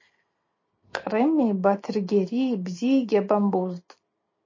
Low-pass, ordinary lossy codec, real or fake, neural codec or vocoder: 7.2 kHz; MP3, 32 kbps; real; none